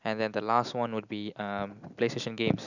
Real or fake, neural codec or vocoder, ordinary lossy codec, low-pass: real; none; none; 7.2 kHz